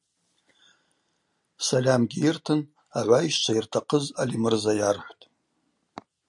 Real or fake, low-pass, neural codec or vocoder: real; 10.8 kHz; none